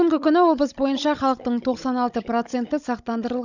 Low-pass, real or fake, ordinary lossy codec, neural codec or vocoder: 7.2 kHz; fake; none; codec, 16 kHz, 16 kbps, FunCodec, trained on Chinese and English, 50 frames a second